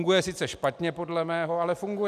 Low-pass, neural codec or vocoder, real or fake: 14.4 kHz; none; real